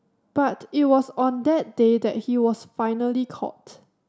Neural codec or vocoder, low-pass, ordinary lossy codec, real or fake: none; none; none; real